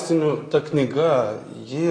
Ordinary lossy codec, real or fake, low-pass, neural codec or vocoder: AAC, 32 kbps; real; 9.9 kHz; none